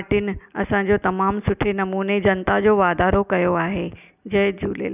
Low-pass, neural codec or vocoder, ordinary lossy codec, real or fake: 3.6 kHz; none; none; real